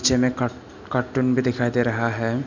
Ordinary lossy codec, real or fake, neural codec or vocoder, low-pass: none; real; none; 7.2 kHz